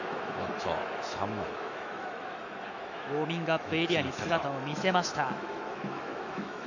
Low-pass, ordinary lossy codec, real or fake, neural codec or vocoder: 7.2 kHz; none; fake; autoencoder, 48 kHz, 128 numbers a frame, DAC-VAE, trained on Japanese speech